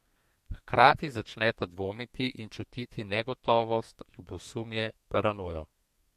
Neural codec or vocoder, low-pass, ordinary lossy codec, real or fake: codec, 32 kHz, 1.9 kbps, SNAC; 14.4 kHz; MP3, 64 kbps; fake